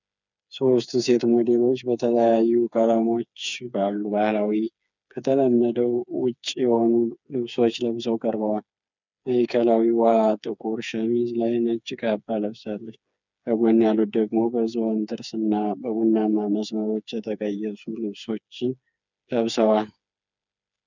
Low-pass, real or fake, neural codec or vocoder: 7.2 kHz; fake; codec, 16 kHz, 4 kbps, FreqCodec, smaller model